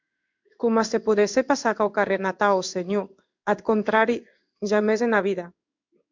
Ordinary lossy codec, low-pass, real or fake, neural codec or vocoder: MP3, 64 kbps; 7.2 kHz; fake; codec, 16 kHz in and 24 kHz out, 1 kbps, XY-Tokenizer